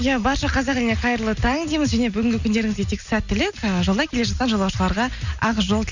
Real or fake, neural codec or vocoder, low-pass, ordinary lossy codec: real; none; 7.2 kHz; none